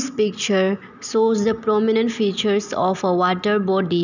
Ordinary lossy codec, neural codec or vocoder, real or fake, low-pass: none; none; real; 7.2 kHz